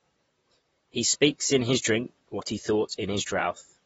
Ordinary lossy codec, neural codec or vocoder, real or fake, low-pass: AAC, 24 kbps; vocoder, 44.1 kHz, 128 mel bands, Pupu-Vocoder; fake; 19.8 kHz